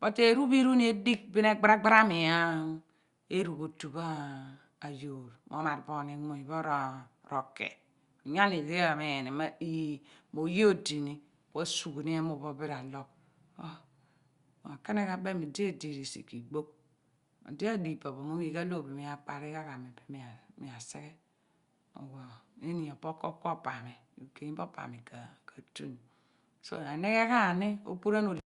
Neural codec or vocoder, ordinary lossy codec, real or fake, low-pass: none; Opus, 64 kbps; real; 10.8 kHz